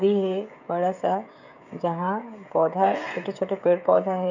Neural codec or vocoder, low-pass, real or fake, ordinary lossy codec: codec, 16 kHz, 16 kbps, FreqCodec, smaller model; 7.2 kHz; fake; none